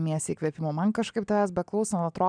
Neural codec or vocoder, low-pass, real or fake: none; 9.9 kHz; real